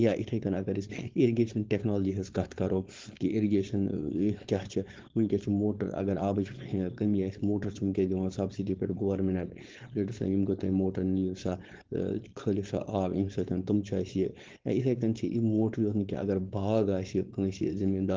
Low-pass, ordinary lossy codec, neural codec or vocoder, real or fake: 7.2 kHz; Opus, 16 kbps; codec, 16 kHz, 4.8 kbps, FACodec; fake